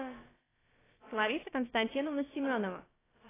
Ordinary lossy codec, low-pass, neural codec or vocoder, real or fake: AAC, 16 kbps; 3.6 kHz; codec, 16 kHz, about 1 kbps, DyCAST, with the encoder's durations; fake